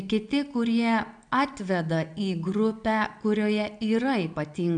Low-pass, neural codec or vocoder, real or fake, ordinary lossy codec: 9.9 kHz; none; real; MP3, 96 kbps